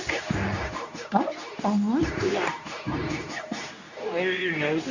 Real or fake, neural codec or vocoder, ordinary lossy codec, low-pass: fake; codec, 24 kHz, 0.9 kbps, WavTokenizer, medium speech release version 2; none; 7.2 kHz